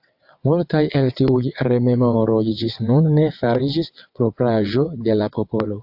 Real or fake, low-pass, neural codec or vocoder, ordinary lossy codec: fake; 5.4 kHz; vocoder, 22.05 kHz, 80 mel bands, WaveNeXt; AAC, 48 kbps